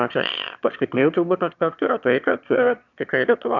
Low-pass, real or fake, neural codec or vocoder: 7.2 kHz; fake; autoencoder, 22.05 kHz, a latent of 192 numbers a frame, VITS, trained on one speaker